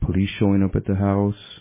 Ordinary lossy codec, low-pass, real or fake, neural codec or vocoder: MP3, 16 kbps; 3.6 kHz; real; none